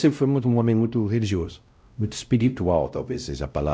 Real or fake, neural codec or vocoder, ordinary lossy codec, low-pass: fake; codec, 16 kHz, 0.5 kbps, X-Codec, WavLM features, trained on Multilingual LibriSpeech; none; none